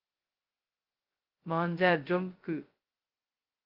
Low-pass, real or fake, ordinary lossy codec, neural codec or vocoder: 5.4 kHz; fake; Opus, 32 kbps; codec, 16 kHz, 0.2 kbps, FocalCodec